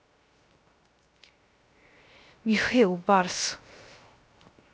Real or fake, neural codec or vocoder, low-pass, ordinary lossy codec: fake; codec, 16 kHz, 0.3 kbps, FocalCodec; none; none